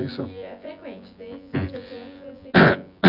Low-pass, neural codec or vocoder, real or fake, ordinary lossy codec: 5.4 kHz; vocoder, 24 kHz, 100 mel bands, Vocos; fake; none